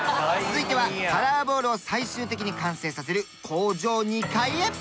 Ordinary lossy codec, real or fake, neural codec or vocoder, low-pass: none; real; none; none